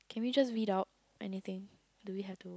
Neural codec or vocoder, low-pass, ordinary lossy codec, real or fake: none; none; none; real